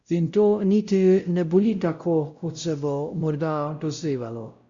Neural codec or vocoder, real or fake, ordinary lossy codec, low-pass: codec, 16 kHz, 0.5 kbps, X-Codec, WavLM features, trained on Multilingual LibriSpeech; fake; Opus, 64 kbps; 7.2 kHz